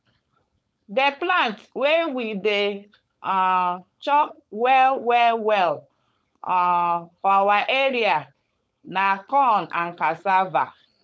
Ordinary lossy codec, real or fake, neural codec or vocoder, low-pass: none; fake; codec, 16 kHz, 4.8 kbps, FACodec; none